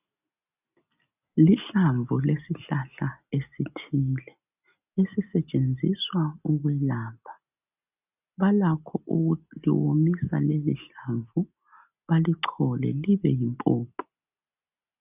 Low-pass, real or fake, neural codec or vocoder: 3.6 kHz; real; none